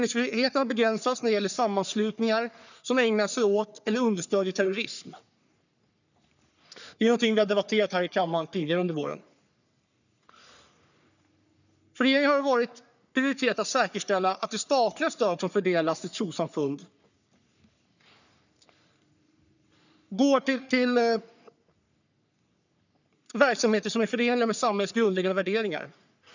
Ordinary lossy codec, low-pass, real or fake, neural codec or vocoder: none; 7.2 kHz; fake; codec, 44.1 kHz, 3.4 kbps, Pupu-Codec